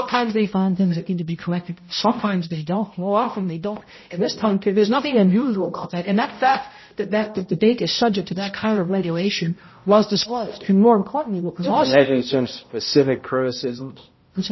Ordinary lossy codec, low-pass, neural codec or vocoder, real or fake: MP3, 24 kbps; 7.2 kHz; codec, 16 kHz, 0.5 kbps, X-Codec, HuBERT features, trained on balanced general audio; fake